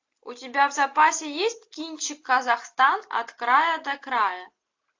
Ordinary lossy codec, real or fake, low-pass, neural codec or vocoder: AAC, 48 kbps; real; 7.2 kHz; none